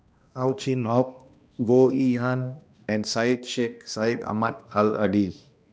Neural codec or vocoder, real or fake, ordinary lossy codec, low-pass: codec, 16 kHz, 1 kbps, X-Codec, HuBERT features, trained on balanced general audio; fake; none; none